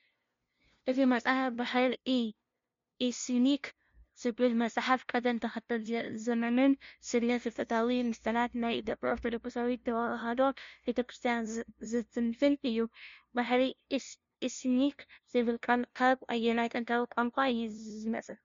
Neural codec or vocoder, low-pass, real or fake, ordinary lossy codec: codec, 16 kHz, 0.5 kbps, FunCodec, trained on LibriTTS, 25 frames a second; 7.2 kHz; fake; MP3, 48 kbps